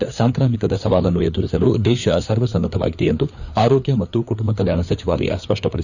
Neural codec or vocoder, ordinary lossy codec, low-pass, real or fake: codec, 16 kHz, 4 kbps, FreqCodec, larger model; none; 7.2 kHz; fake